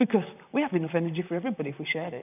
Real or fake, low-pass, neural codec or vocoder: fake; 3.6 kHz; vocoder, 44.1 kHz, 128 mel bands, Pupu-Vocoder